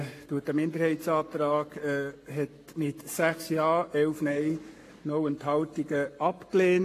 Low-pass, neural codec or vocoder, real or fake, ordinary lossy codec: 14.4 kHz; codec, 44.1 kHz, 7.8 kbps, Pupu-Codec; fake; AAC, 48 kbps